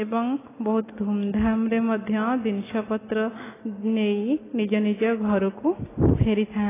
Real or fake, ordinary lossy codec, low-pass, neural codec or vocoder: real; AAC, 16 kbps; 3.6 kHz; none